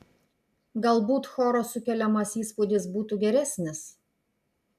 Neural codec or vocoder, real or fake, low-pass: none; real; 14.4 kHz